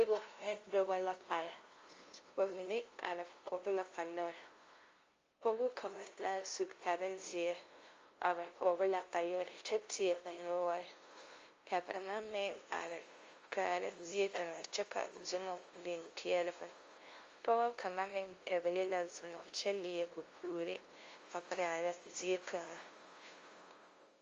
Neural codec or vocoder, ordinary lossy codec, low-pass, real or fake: codec, 16 kHz, 0.5 kbps, FunCodec, trained on LibriTTS, 25 frames a second; Opus, 32 kbps; 7.2 kHz; fake